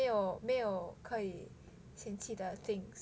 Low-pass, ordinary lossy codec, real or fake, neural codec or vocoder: none; none; real; none